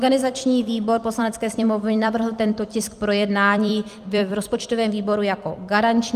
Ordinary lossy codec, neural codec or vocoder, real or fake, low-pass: Opus, 32 kbps; vocoder, 44.1 kHz, 128 mel bands every 256 samples, BigVGAN v2; fake; 14.4 kHz